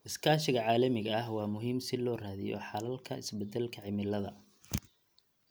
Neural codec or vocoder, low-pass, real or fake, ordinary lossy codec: none; none; real; none